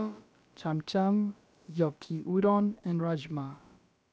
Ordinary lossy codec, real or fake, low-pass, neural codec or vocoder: none; fake; none; codec, 16 kHz, about 1 kbps, DyCAST, with the encoder's durations